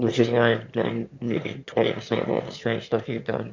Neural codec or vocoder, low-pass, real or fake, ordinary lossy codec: autoencoder, 22.05 kHz, a latent of 192 numbers a frame, VITS, trained on one speaker; 7.2 kHz; fake; MP3, 48 kbps